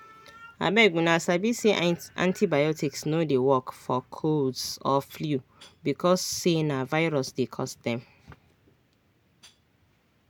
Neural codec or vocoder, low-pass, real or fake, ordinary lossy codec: none; none; real; none